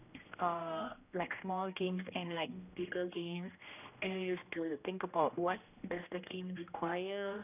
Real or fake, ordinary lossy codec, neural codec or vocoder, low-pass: fake; none; codec, 16 kHz, 1 kbps, X-Codec, HuBERT features, trained on general audio; 3.6 kHz